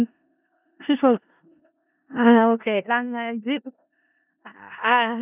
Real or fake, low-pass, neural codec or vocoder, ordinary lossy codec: fake; 3.6 kHz; codec, 16 kHz in and 24 kHz out, 0.4 kbps, LongCat-Audio-Codec, four codebook decoder; none